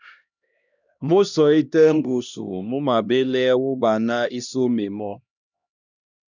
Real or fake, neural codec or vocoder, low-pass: fake; codec, 16 kHz, 1 kbps, X-Codec, HuBERT features, trained on LibriSpeech; 7.2 kHz